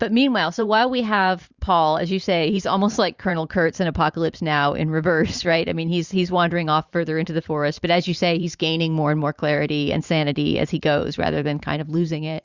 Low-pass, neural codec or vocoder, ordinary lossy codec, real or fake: 7.2 kHz; vocoder, 44.1 kHz, 128 mel bands every 512 samples, BigVGAN v2; Opus, 64 kbps; fake